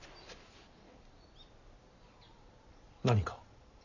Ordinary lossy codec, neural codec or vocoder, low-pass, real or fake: none; none; 7.2 kHz; real